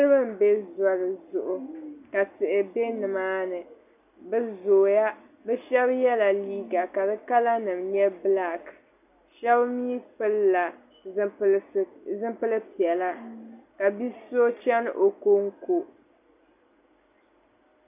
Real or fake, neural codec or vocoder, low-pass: real; none; 3.6 kHz